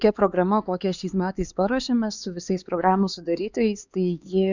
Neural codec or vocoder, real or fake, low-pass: codec, 16 kHz, 4 kbps, X-Codec, HuBERT features, trained on LibriSpeech; fake; 7.2 kHz